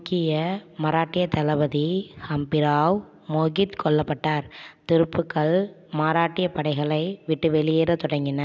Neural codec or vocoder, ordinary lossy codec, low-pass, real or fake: none; none; none; real